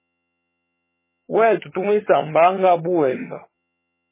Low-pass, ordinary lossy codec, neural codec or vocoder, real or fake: 3.6 kHz; MP3, 16 kbps; vocoder, 22.05 kHz, 80 mel bands, HiFi-GAN; fake